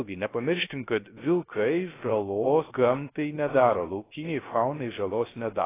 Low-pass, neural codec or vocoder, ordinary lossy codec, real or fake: 3.6 kHz; codec, 16 kHz, 0.3 kbps, FocalCodec; AAC, 16 kbps; fake